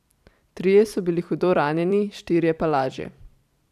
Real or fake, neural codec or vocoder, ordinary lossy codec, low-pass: fake; vocoder, 44.1 kHz, 128 mel bands every 256 samples, BigVGAN v2; none; 14.4 kHz